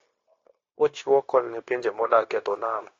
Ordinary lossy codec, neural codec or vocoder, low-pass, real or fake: AAC, 32 kbps; codec, 16 kHz, 0.9 kbps, LongCat-Audio-Codec; 7.2 kHz; fake